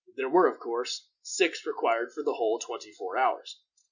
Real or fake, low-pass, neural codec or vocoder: real; 7.2 kHz; none